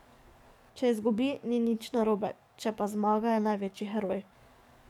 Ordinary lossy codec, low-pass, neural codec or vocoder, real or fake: none; 19.8 kHz; codec, 44.1 kHz, 7.8 kbps, DAC; fake